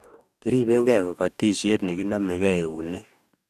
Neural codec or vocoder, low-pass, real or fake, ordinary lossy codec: codec, 44.1 kHz, 2.6 kbps, DAC; 14.4 kHz; fake; none